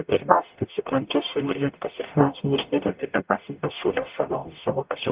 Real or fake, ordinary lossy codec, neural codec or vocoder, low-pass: fake; Opus, 32 kbps; codec, 44.1 kHz, 0.9 kbps, DAC; 3.6 kHz